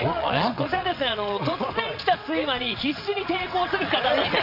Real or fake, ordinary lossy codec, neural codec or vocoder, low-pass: fake; none; vocoder, 22.05 kHz, 80 mel bands, WaveNeXt; 5.4 kHz